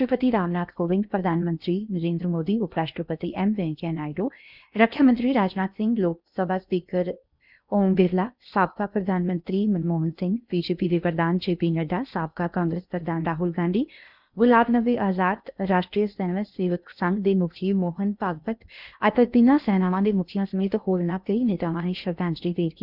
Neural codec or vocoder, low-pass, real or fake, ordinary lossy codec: codec, 16 kHz in and 24 kHz out, 0.8 kbps, FocalCodec, streaming, 65536 codes; 5.4 kHz; fake; none